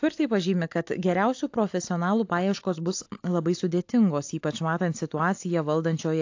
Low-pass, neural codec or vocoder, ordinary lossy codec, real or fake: 7.2 kHz; none; AAC, 48 kbps; real